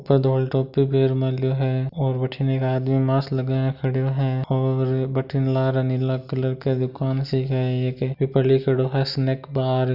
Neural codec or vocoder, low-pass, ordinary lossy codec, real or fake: none; 5.4 kHz; none; real